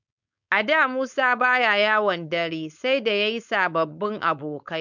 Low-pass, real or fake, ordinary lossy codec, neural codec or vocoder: 7.2 kHz; fake; none; codec, 16 kHz, 4.8 kbps, FACodec